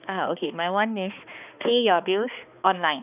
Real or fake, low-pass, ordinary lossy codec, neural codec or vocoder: fake; 3.6 kHz; none; codec, 16 kHz, 2 kbps, X-Codec, HuBERT features, trained on balanced general audio